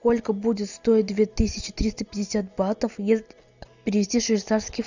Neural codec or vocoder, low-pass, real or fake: none; 7.2 kHz; real